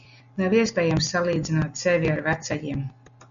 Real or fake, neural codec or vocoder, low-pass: real; none; 7.2 kHz